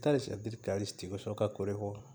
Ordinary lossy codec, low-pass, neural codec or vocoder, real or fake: none; none; none; real